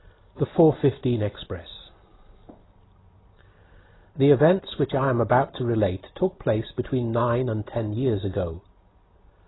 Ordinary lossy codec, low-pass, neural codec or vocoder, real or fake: AAC, 16 kbps; 7.2 kHz; none; real